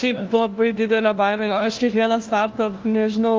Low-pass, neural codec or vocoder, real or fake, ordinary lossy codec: 7.2 kHz; codec, 16 kHz, 1 kbps, FunCodec, trained on LibriTTS, 50 frames a second; fake; Opus, 24 kbps